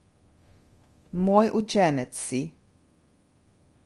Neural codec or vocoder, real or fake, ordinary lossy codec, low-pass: codec, 24 kHz, 0.9 kbps, DualCodec; fake; Opus, 24 kbps; 10.8 kHz